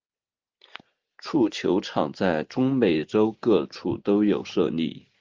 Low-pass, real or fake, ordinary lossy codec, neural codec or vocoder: 7.2 kHz; real; Opus, 32 kbps; none